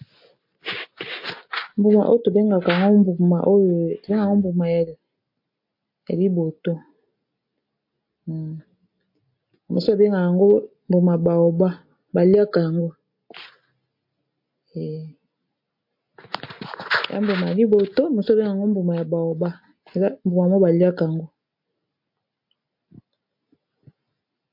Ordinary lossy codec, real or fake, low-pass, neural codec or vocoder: MP3, 32 kbps; real; 5.4 kHz; none